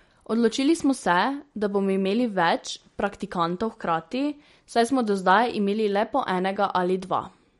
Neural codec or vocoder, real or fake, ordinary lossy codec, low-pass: none; real; MP3, 48 kbps; 19.8 kHz